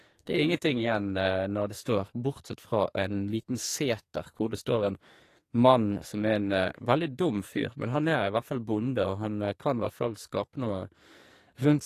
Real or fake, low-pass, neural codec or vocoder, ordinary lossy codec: fake; 14.4 kHz; codec, 44.1 kHz, 2.6 kbps, SNAC; AAC, 48 kbps